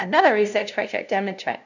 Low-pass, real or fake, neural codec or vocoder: 7.2 kHz; fake; codec, 16 kHz, 0.5 kbps, FunCodec, trained on LibriTTS, 25 frames a second